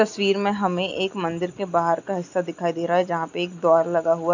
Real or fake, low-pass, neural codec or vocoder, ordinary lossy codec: real; 7.2 kHz; none; none